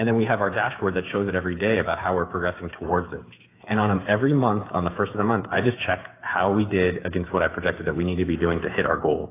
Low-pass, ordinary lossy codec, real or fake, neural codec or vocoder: 3.6 kHz; AAC, 24 kbps; fake; codec, 16 kHz, 8 kbps, FreqCodec, smaller model